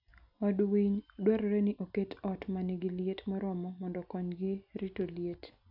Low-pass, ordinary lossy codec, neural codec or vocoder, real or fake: 5.4 kHz; none; none; real